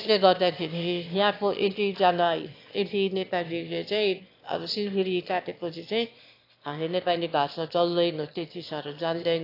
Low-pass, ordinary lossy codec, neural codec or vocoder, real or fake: 5.4 kHz; AAC, 32 kbps; autoencoder, 22.05 kHz, a latent of 192 numbers a frame, VITS, trained on one speaker; fake